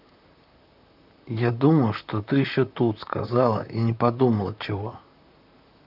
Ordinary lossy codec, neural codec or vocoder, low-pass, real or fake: none; vocoder, 44.1 kHz, 128 mel bands, Pupu-Vocoder; 5.4 kHz; fake